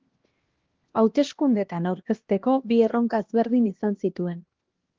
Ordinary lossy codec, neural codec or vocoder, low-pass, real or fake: Opus, 16 kbps; codec, 16 kHz, 1 kbps, X-Codec, HuBERT features, trained on LibriSpeech; 7.2 kHz; fake